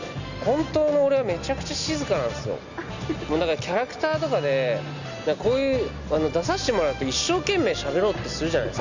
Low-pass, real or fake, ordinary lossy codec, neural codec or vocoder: 7.2 kHz; real; none; none